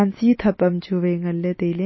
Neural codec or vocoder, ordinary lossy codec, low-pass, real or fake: none; MP3, 24 kbps; 7.2 kHz; real